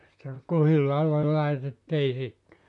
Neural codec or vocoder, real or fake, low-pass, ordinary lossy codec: vocoder, 44.1 kHz, 128 mel bands, Pupu-Vocoder; fake; 10.8 kHz; none